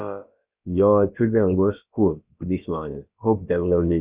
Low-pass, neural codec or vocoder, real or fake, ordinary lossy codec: 3.6 kHz; codec, 16 kHz, about 1 kbps, DyCAST, with the encoder's durations; fake; Opus, 64 kbps